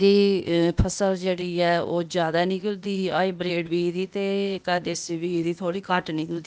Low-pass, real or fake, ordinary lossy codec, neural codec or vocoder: none; fake; none; codec, 16 kHz, 0.8 kbps, ZipCodec